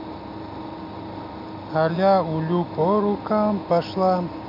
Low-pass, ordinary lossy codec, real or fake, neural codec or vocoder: 5.4 kHz; MP3, 48 kbps; real; none